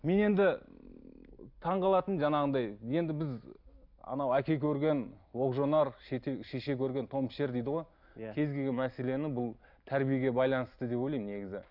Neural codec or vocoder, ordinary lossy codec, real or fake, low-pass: none; none; real; 5.4 kHz